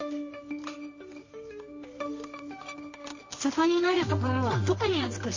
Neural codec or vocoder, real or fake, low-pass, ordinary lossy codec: codec, 24 kHz, 0.9 kbps, WavTokenizer, medium music audio release; fake; 7.2 kHz; MP3, 32 kbps